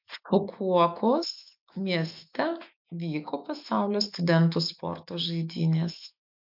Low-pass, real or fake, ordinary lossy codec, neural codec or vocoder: 5.4 kHz; fake; MP3, 48 kbps; autoencoder, 48 kHz, 128 numbers a frame, DAC-VAE, trained on Japanese speech